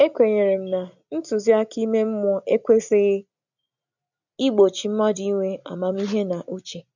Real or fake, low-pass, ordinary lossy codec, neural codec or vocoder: real; 7.2 kHz; none; none